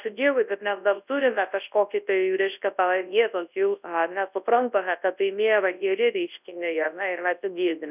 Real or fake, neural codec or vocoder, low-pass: fake; codec, 24 kHz, 0.9 kbps, WavTokenizer, large speech release; 3.6 kHz